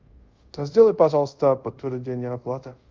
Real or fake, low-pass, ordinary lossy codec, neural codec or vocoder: fake; 7.2 kHz; Opus, 32 kbps; codec, 24 kHz, 0.5 kbps, DualCodec